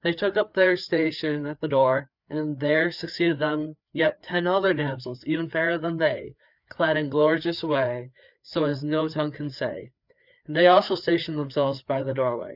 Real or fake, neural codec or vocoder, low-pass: fake; codec, 16 kHz, 4 kbps, FreqCodec, larger model; 5.4 kHz